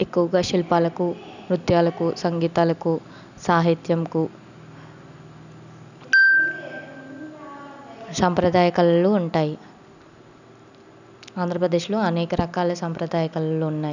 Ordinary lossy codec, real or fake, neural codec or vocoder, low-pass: none; real; none; 7.2 kHz